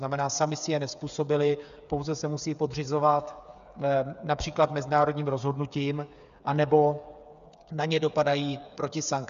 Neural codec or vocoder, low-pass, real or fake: codec, 16 kHz, 8 kbps, FreqCodec, smaller model; 7.2 kHz; fake